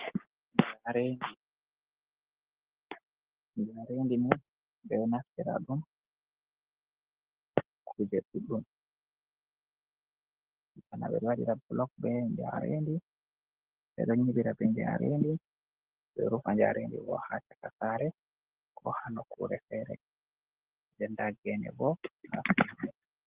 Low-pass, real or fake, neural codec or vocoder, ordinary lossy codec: 3.6 kHz; real; none; Opus, 16 kbps